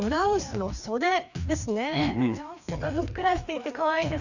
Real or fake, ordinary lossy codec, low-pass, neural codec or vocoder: fake; none; 7.2 kHz; codec, 16 kHz, 2 kbps, FreqCodec, larger model